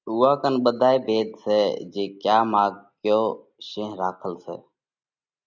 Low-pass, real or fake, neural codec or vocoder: 7.2 kHz; real; none